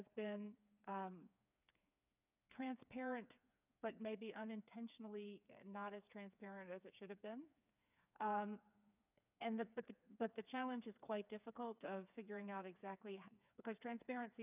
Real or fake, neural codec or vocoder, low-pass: fake; codec, 16 kHz, 4 kbps, FreqCodec, smaller model; 3.6 kHz